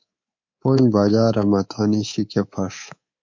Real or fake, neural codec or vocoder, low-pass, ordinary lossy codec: fake; codec, 24 kHz, 3.1 kbps, DualCodec; 7.2 kHz; MP3, 48 kbps